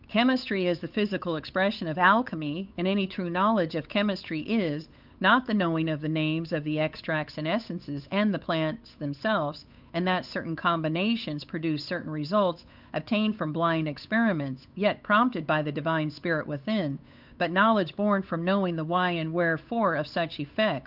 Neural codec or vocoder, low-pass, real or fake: codec, 16 kHz, 8 kbps, FunCodec, trained on Chinese and English, 25 frames a second; 5.4 kHz; fake